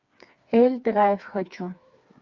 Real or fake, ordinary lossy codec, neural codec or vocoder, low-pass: fake; Opus, 64 kbps; codec, 16 kHz, 4 kbps, FreqCodec, smaller model; 7.2 kHz